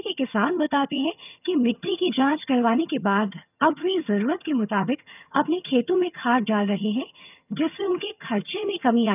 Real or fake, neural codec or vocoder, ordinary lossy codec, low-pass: fake; vocoder, 22.05 kHz, 80 mel bands, HiFi-GAN; none; 3.6 kHz